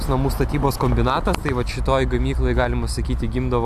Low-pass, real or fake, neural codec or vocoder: 14.4 kHz; real; none